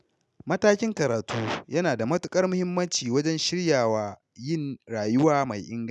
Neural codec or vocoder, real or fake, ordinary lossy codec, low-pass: none; real; none; none